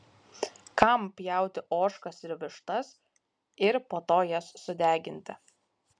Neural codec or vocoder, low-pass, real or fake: none; 9.9 kHz; real